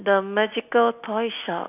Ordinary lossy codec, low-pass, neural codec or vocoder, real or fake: AAC, 32 kbps; 3.6 kHz; none; real